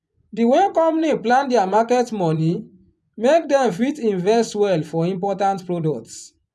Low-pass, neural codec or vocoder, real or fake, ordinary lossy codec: none; vocoder, 24 kHz, 100 mel bands, Vocos; fake; none